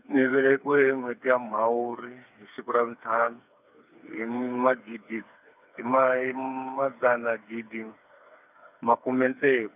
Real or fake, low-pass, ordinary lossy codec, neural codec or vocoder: fake; 3.6 kHz; none; codec, 16 kHz, 4 kbps, FreqCodec, smaller model